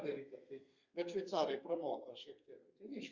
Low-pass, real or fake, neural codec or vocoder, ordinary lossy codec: 7.2 kHz; fake; codec, 32 kHz, 1.9 kbps, SNAC; Opus, 64 kbps